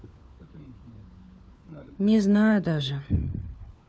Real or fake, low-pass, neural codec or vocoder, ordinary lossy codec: fake; none; codec, 16 kHz, 4 kbps, FunCodec, trained on LibriTTS, 50 frames a second; none